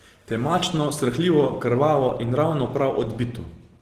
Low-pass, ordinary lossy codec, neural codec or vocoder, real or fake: 14.4 kHz; Opus, 16 kbps; none; real